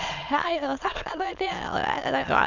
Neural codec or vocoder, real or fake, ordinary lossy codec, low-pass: autoencoder, 22.05 kHz, a latent of 192 numbers a frame, VITS, trained on many speakers; fake; none; 7.2 kHz